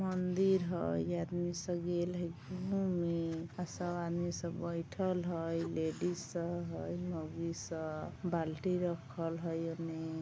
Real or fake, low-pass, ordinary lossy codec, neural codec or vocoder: real; none; none; none